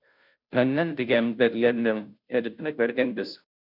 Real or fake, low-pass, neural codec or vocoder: fake; 5.4 kHz; codec, 16 kHz, 0.5 kbps, FunCodec, trained on Chinese and English, 25 frames a second